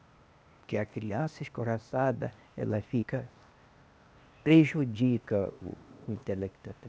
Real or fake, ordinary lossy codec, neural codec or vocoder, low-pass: fake; none; codec, 16 kHz, 0.8 kbps, ZipCodec; none